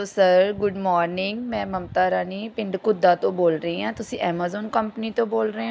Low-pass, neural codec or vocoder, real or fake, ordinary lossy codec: none; none; real; none